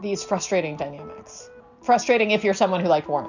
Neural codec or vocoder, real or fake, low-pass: none; real; 7.2 kHz